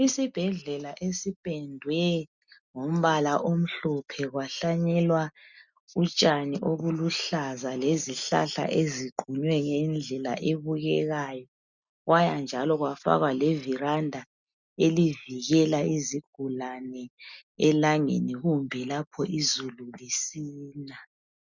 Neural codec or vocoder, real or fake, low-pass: none; real; 7.2 kHz